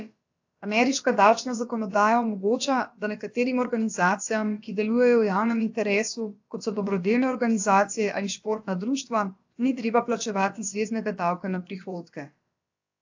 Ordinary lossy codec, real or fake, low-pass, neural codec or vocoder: AAC, 48 kbps; fake; 7.2 kHz; codec, 16 kHz, about 1 kbps, DyCAST, with the encoder's durations